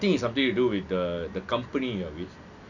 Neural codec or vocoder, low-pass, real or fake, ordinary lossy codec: none; 7.2 kHz; real; none